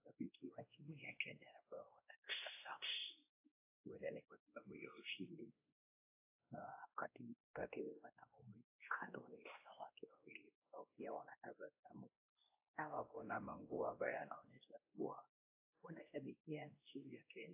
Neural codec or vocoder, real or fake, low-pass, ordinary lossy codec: codec, 16 kHz, 1 kbps, X-Codec, WavLM features, trained on Multilingual LibriSpeech; fake; 3.6 kHz; AAC, 32 kbps